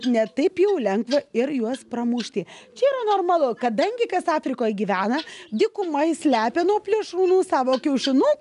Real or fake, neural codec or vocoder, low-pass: real; none; 10.8 kHz